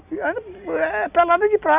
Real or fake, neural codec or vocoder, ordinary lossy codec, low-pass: real; none; none; 3.6 kHz